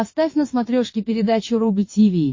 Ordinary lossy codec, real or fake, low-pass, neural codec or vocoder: MP3, 32 kbps; fake; 7.2 kHz; vocoder, 22.05 kHz, 80 mel bands, WaveNeXt